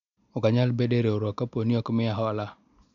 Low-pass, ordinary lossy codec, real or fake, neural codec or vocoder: 7.2 kHz; MP3, 96 kbps; real; none